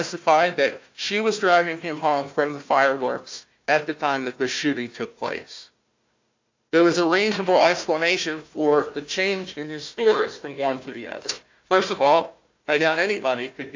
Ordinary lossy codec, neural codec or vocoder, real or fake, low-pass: MP3, 64 kbps; codec, 16 kHz, 1 kbps, FunCodec, trained on Chinese and English, 50 frames a second; fake; 7.2 kHz